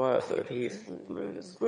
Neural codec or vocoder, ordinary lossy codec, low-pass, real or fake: autoencoder, 22.05 kHz, a latent of 192 numbers a frame, VITS, trained on one speaker; MP3, 48 kbps; 9.9 kHz; fake